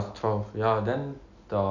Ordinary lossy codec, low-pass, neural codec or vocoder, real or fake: none; 7.2 kHz; none; real